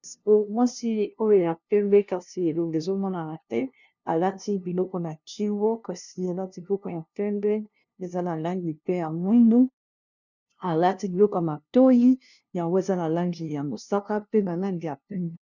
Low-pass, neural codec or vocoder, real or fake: 7.2 kHz; codec, 16 kHz, 0.5 kbps, FunCodec, trained on LibriTTS, 25 frames a second; fake